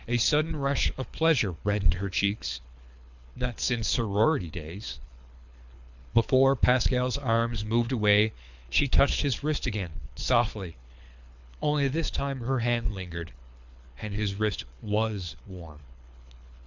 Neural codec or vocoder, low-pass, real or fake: codec, 24 kHz, 6 kbps, HILCodec; 7.2 kHz; fake